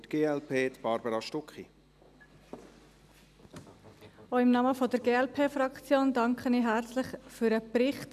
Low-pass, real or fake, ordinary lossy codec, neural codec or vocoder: 14.4 kHz; real; none; none